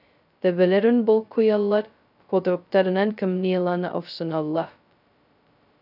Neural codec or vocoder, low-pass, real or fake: codec, 16 kHz, 0.2 kbps, FocalCodec; 5.4 kHz; fake